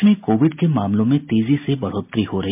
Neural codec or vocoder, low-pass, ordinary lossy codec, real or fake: none; 3.6 kHz; MP3, 32 kbps; real